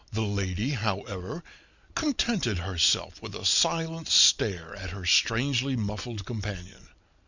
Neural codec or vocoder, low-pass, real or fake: none; 7.2 kHz; real